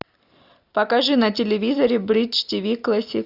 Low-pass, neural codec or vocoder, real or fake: 5.4 kHz; none; real